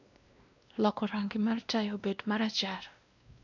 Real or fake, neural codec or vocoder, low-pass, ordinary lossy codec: fake; codec, 16 kHz, 1 kbps, X-Codec, WavLM features, trained on Multilingual LibriSpeech; 7.2 kHz; none